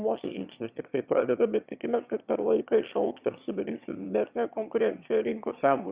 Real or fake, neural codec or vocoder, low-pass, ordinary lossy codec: fake; autoencoder, 22.05 kHz, a latent of 192 numbers a frame, VITS, trained on one speaker; 3.6 kHz; Opus, 64 kbps